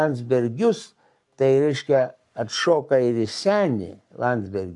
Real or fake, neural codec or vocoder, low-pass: fake; codec, 44.1 kHz, 7.8 kbps, Pupu-Codec; 10.8 kHz